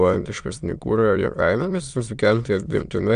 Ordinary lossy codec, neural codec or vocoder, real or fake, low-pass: Opus, 64 kbps; autoencoder, 22.05 kHz, a latent of 192 numbers a frame, VITS, trained on many speakers; fake; 9.9 kHz